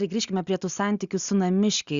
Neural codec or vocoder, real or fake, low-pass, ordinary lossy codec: none; real; 7.2 kHz; Opus, 64 kbps